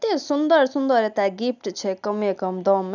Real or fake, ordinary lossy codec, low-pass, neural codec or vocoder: real; none; 7.2 kHz; none